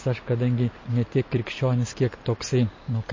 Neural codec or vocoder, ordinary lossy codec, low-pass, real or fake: none; MP3, 32 kbps; 7.2 kHz; real